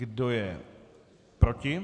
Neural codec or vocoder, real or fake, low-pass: none; real; 10.8 kHz